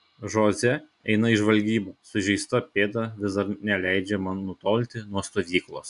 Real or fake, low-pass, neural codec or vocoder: real; 10.8 kHz; none